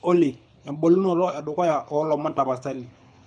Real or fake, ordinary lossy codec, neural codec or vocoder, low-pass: fake; none; codec, 24 kHz, 6 kbps, HILCodec; 9.9 kHz